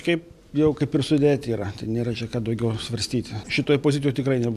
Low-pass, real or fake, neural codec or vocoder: 14.4 kHz; real; none